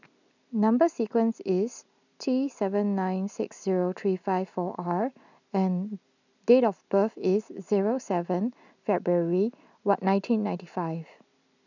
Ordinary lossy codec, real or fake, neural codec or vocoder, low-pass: none; real; none; 7.2 kHz